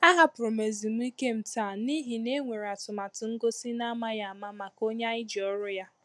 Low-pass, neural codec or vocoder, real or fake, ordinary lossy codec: none; none; real; none